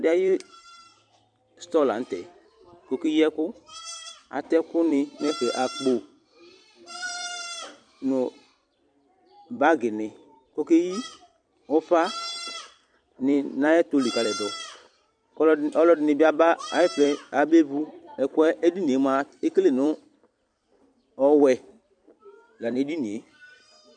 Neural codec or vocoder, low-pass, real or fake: vocoder, 44.1 kHz, 128 mel bands every 256 samples, BigVGAN v2; 9.9 kHz; fake